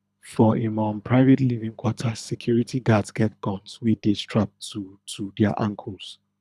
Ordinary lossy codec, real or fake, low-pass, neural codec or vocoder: none; fake; none; codec, 24 kHz, 6 kbps, HILCodec